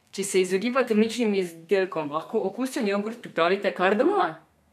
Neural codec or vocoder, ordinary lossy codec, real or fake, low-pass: codec, 32 kHz, 1.9 kbps, SNAC; none; fake; 14.4 kHz